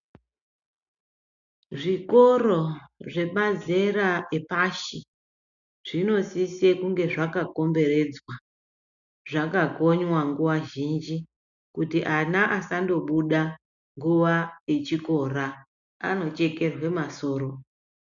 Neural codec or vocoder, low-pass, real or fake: none; 7.2 kHz; real